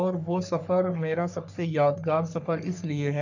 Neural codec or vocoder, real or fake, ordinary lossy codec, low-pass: codec, 44.1 kHz, 3.4 kbps, Pupu-Codec; fake; none; 7.2 kHz